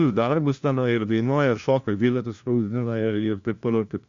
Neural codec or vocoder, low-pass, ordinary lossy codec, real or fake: codec, 16 kHz, 1 kbps, FunCodec, trained on LibriTTS, 50 frames a second; 7.2 kHz; Opus, 64 kbps; fake